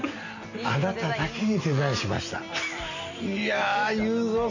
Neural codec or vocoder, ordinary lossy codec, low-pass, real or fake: none; none; 7.2 kHz; real